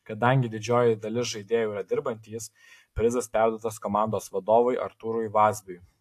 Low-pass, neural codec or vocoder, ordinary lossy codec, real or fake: 14.4 kHz; none; AAC, 64 kbps; real